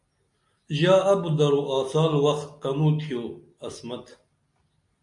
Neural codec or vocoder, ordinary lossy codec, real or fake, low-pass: none; MP3, 64 kbps; real; 10.8 kHz